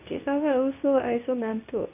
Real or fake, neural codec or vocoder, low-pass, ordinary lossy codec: fake; codec, 24 kHz, 0.9 kbps, WavTokenizer, medium speech release version 2; 3.6 kHz; none